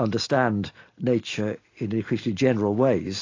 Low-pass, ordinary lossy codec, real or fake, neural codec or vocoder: 7.2 kHz; AAC, 32 kbps; real; none